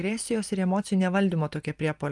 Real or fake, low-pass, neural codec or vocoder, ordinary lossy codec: real; 10.8 kHz; none; Opus, 32 kbps